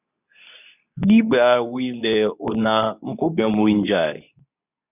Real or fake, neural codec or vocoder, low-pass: fake; codec, 24 kHz, 0.9 kbps, WavTokenizer, medium speech release version 2; 3.6 kHz